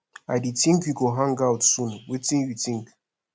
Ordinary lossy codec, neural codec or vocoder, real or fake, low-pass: none; none; real; none